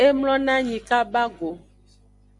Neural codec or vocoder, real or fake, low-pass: none; real; 10.8 kHz